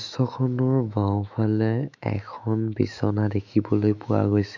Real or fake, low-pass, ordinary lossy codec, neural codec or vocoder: fake; 7.2 kHz; none; autoencoder, 48 kHz, 128 numbers a frame, DAC-VAE, trained on Japanese speech